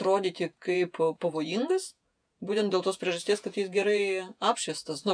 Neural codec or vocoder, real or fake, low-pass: vocoder, 48 kHz, 128 mel bands, Vocos; fake; 9.9 kHz